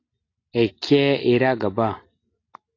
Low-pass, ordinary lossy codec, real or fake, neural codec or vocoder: 7.2 kHz; AAC, 32 kbps; real; none